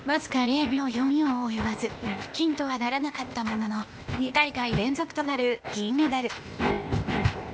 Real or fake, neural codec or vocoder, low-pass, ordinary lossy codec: fake; codec, 16 kHz, 0.8 kbps, ZipCodec; none; none